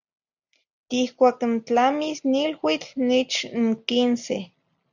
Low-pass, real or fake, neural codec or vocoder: 7.2 kHz; real; none